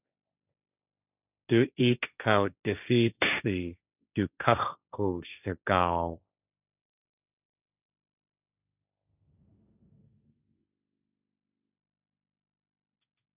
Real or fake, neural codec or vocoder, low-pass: fake; codec, 16 kHz, 1.1 kbps, Voila-Tokenizer; 3.6 kHz